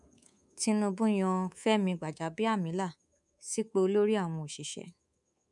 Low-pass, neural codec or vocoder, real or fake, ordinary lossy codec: 10.8 kHz; codec, 24 kHz, 3.1 kbps, DualCodec; fake; none